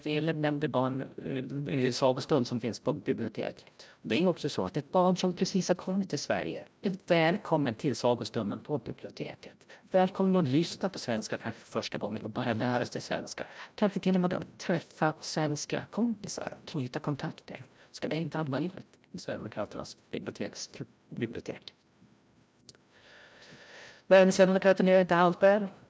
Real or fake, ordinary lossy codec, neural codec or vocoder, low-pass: fake; none; codec, 16 kHz, 0.5 kbps, FreqCodec, larger model; none